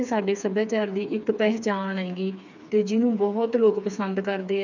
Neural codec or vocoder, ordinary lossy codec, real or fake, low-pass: codec, 16 kHz, 4 kbps, FreqCodec, smaller model; none; fake; 7.2 kHz